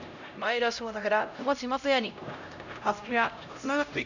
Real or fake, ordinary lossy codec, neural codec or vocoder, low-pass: fake; none; codec, 16 kHz, 0.5 kbps, X-Codec, HuBERT features, trained on LibriSpeech; 7.2 kHz